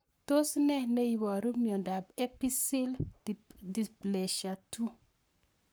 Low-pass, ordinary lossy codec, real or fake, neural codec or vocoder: none; none; fake; codec, 44.1 kHz, 7.8 kbps, Pupu-Codec